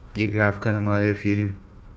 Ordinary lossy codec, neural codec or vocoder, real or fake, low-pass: none; codec, 16 kHz, 1 kbps, FunCodec, trained on Chinese and English, 50 frames a second; fake; none